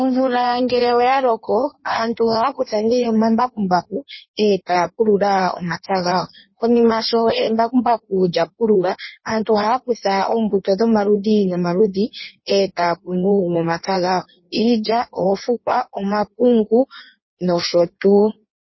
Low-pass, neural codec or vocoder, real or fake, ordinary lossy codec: 7.2 kHz; codec, 16 kHz in and 24 kHz out, 1.1 kbps, FireRedTTS-2 codec; fake; MP3, 24 kbps